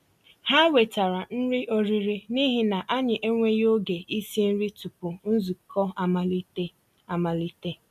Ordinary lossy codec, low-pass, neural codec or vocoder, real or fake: none; 14.4 kHz; none; real